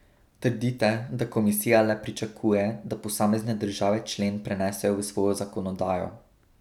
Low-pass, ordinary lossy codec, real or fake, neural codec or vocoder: 19.8 kHz; none; real; none